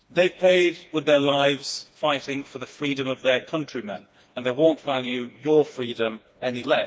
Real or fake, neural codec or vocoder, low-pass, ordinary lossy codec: fake; codec, 16 kHz, 2 kbps, FreqCodec, smaller model; none; none